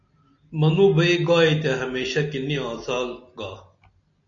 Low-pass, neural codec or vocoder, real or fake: 7.2 kHz; none; real